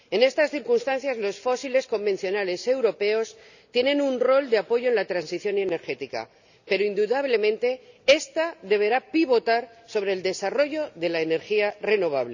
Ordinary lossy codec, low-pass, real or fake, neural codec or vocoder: none; 7.2 kHz; real; none